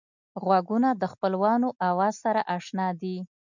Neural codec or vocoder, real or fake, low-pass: none; real; 7.2 kHz